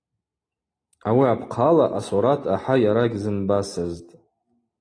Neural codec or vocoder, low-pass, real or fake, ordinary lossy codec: none; 9.9 kHz; real; AAC, 32 kbps